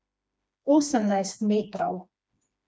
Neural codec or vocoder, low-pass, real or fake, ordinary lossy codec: codec, 16 kHz, 2 kbps, FreqCodec, smaller model; none; fake; none